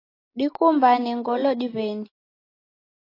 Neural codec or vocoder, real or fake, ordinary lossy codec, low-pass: none; real; AAC, 24 kbps; 5.4 kHz